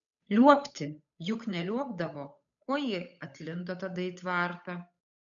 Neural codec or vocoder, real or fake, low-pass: codec, 16 kHz, 8 kbps, FunCodec, trained on Chinese and English, 25 frames a second; fake; 7.2 kHz